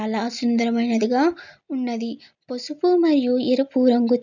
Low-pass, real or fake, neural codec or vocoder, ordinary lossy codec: 7.2 kHz; real; none; none